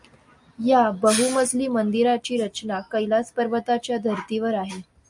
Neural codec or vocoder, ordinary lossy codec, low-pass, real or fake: none; MP3, 64 kbps; 10.8 kHz; real